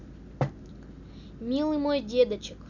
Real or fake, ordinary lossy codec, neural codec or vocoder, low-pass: real; none; none; 7.2 kHz